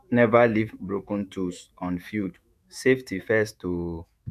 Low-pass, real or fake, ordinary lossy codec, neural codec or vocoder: 14.4 kHz; fake; MP3, 96 kbps; autoencoder, 48 kHz, 128 numbers a frame, DAC-VAE, trained on Japanese speech